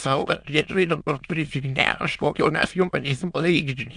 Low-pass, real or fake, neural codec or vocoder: 9.9 kHz; fake; autoencoder, 22.05 kHz, a latent of 192 numbers a frame, VITS, trained on many speakers